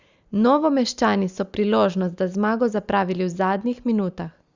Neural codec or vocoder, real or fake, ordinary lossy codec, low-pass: none; real; Opus, 64 kbps; 7.2 kHz